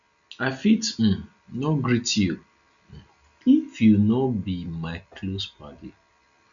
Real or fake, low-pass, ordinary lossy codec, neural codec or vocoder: real; 7.2 kHz; none; none